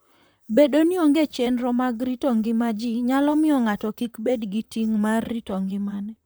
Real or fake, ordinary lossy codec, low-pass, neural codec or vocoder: fake; none; none; vocoder, 44.1 kHz, 128 mel bands, Pupu-Vocoder